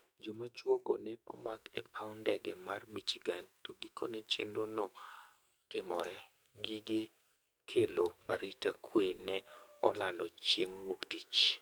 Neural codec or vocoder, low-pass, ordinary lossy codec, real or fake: codec, 44.1 kHz, 2.6 kbps, SNAC; none; none; fake